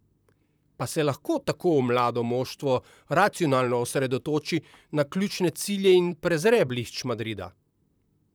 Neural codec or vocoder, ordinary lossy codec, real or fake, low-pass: vocoder, 44.1 kHz, 128 mel bands, Pupu-Vocoder; none; fake; none